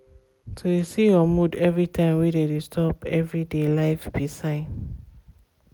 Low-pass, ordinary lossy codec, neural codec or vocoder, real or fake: none; none; none; real